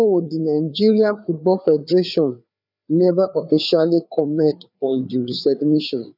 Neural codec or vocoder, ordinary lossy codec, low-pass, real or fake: codec, 16 kHz, 4 kbps, FreqCodec, larger model; none; 5.4 kHz; fake